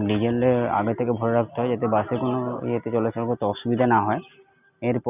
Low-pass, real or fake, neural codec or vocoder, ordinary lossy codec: 3.6 kHz; real; none; none